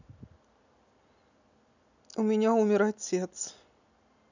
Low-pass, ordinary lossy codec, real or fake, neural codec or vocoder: 7.2 kHz; none; real; none